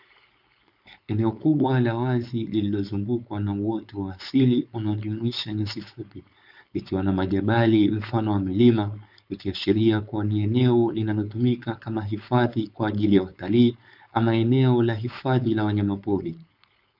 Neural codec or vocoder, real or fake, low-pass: codec, 16 kHz, 4.8 kbps, FACodec; fake; 5.4 kHz